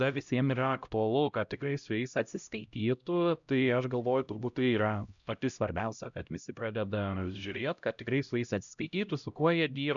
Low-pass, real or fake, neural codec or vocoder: 7.2 kHz; fake; codec, 16 kHz, 0.5 kbps, X-Codec, HuBERT features, trained on LibriSpeech